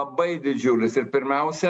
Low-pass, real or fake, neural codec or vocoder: 9.9 kHz; real; none